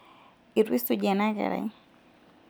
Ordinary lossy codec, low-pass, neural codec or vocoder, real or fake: none; none; none; real